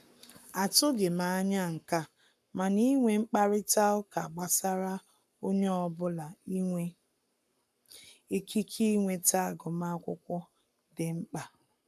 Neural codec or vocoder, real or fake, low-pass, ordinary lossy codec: codec, 44.1 kHz, 7.8 kbps, Pupu-Codec; fake; 14.4 kHz; none